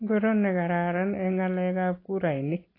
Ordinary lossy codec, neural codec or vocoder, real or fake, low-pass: AAC, 24 kbps; none; real; 5.4 kHz